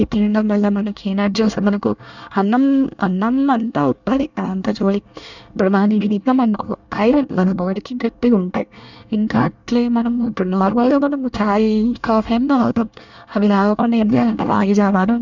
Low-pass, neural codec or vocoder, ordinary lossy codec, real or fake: 7.2 kHz; codec, 24 kHz, 1 kbps, SNAC; none; fake